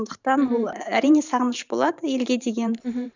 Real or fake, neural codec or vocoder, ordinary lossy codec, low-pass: fake; vocoder, 44.1 kHz, 128 mel bands every 512 samples, BigVGAN v2; none; 7.2 kHz